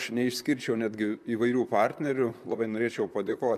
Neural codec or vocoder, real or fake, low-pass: vocoder, 44.1 kHz, 128 mel bands, Pupu-Vocoder; fake; 14.4 kHz